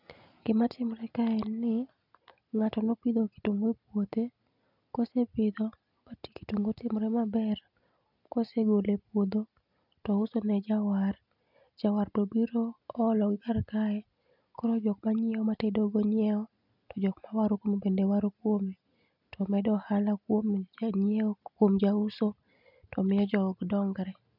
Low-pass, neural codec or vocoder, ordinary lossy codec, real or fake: 5.4 kHz; none; none; real